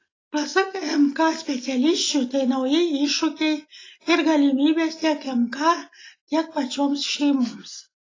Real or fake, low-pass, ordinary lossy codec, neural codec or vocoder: real; 7.2 kHz; AAC, 32 kbps; none